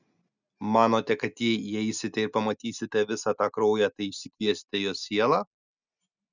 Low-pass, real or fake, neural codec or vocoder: 7.2 kHz; real; none